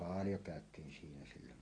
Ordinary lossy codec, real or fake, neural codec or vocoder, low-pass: AAC, 32 kbps; real; none; 9.9 kHz